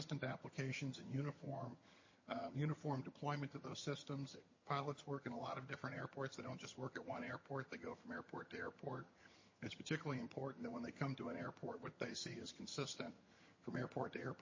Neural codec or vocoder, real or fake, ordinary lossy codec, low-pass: vocoder, 22.05 kHz, 80 mel bands, HiFi-GAN; fake; MP3, 32 kbps; 7.2 kHz